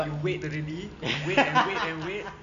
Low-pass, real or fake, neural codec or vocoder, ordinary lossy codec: 7.2 kHz; real; none; MP3, 96 kbps